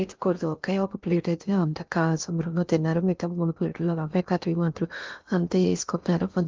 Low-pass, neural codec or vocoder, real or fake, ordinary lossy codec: 7.2 kHz; codec, 16 kHz in and 24 kHz out, 0.6 kbps, FocalCodec, streaming, 2048 codes; fake; Opus, 24 kbps